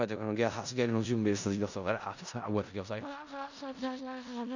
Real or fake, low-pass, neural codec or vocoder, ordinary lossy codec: fake; 7.2 kHz; codec, 16 kHz in and 24 kHz out, 0.4 kbps, LongCat-Audio-Codec, four codebook decoder; none